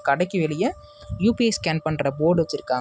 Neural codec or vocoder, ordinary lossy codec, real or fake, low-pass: none; none; real; none